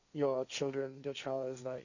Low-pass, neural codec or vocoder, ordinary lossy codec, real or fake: 7.2 kHz; codec, 16 kHz, 1.1 kbps, Voila-Tokenizer; none; fake